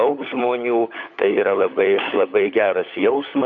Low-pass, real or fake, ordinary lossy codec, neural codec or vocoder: 7.2 kHz; fake; MP3, 48 kbps; codec, 16 kHz, 16 kbps, FunCodec, trained on LibriTTS, 50 frames a second